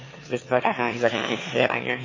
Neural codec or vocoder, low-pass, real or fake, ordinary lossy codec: autoencoder, 22.05 kHz, a latent of 192 numbers a frame, VITS, trained on one speaker; 7.2 kHz; fake; MP3, 32 kbps